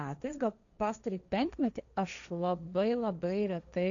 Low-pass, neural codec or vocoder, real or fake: 7.2 kHz; codec, 16 kHz, 1.1 kbps, Voila-Tokenizer; fake